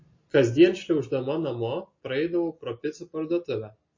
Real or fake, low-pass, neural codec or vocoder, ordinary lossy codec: real; 7.2 kHz; none; MP3, 32 kbps